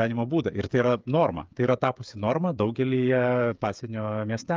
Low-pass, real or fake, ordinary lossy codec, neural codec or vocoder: 7.2 kHz; fake; Opus, 24 kbps; codec, 16 kHz, 8 kbps, FreqCodec, smaller model